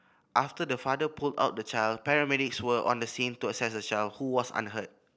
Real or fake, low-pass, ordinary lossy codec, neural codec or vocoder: real; none; none; none